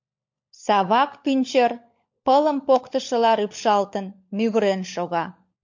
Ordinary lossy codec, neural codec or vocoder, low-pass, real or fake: MP3, 48 kbps; codec, 16 kHz, 16 kbps, FunCodec, trained on LibriTTS, 50 frames a second; 7.2 kHz; fake